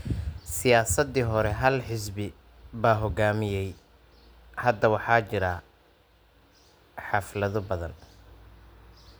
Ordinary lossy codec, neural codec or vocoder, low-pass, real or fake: none; none; none; real